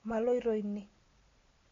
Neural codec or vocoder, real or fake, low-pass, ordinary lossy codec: none; real; 7.2 kHz; AAC, 32 kbps